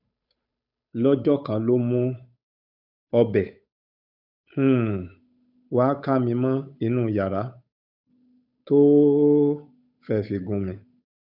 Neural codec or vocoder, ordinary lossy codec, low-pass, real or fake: codec, 16 kHz, 8 kbps, FunCodec, trained on Chinese and English, 25 frames a second; none; 5.4 kHz; fake